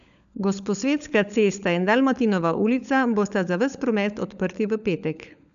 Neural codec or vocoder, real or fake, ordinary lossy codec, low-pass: codec, 16 kHz, 16 kbps, FunCodec, trained on LibriTTS, 50 frames a second; fake; none; 7.2 kHz